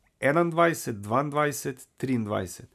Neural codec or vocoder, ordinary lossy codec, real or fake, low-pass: none; MP3, 96 kbps; real; 14.4 kHz